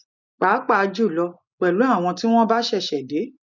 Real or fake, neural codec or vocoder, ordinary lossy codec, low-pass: real; none; none; 7.2 kHz